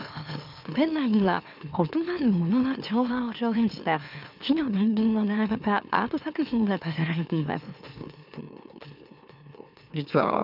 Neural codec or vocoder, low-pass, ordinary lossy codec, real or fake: autoencoder, 44.1 kHz, a latent of 192 numbers a frame, MeloTTS; 5.4 kHz; none; fake